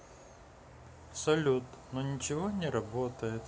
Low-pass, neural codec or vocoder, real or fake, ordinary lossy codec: none; none; real; none